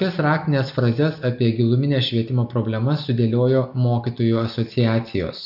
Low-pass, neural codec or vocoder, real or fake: 5.4 kHz; none; real